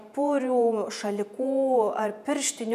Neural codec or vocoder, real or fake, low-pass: vocoder, 48 kHz, 128 mel bands, Vocos; fake; 14.4 kHz